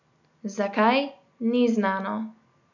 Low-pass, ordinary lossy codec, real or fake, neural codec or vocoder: 7.2 kHz; none; real; none